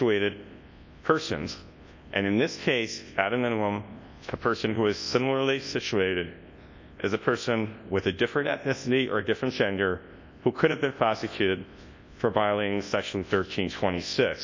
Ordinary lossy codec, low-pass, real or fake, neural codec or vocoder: MP3, 48 kbps; 7.2 kHz; fake; codec, 24 kHz, 0.9 kbps, WavTokenizer, large speech release